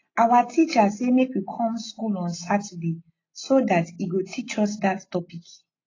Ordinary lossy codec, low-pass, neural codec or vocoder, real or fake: AAC, 32 kbps; 7.2 kHz; none; real